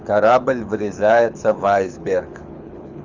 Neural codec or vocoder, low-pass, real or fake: codec, 24 kHz, 6 kbps, HILCodec; 7.2 kHz; fake